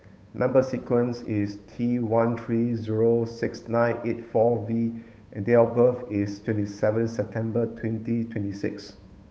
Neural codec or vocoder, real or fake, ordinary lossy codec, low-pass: codec, 16 kHz, 8 kbps, FunCodec, trained on Chinese and English, 25 frames a second; fake; none; none